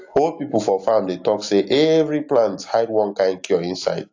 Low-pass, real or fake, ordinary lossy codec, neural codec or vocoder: 7.2 kHz; real; AAC, 48 kbps; none